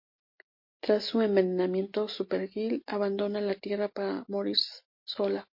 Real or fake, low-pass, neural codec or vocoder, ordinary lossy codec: real; 5.4 kHz; none; MP3, 32 kbps